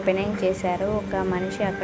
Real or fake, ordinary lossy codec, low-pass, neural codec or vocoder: real; none; none; none